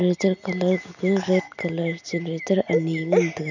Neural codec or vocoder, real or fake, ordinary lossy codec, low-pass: none; real; none; 7.2 kHz